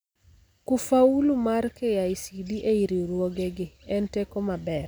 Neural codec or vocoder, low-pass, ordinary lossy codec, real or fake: none; none; none; real